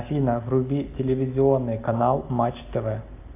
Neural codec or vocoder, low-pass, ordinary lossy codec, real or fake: none; 3.6 kHz; AAC, 24 kbps; real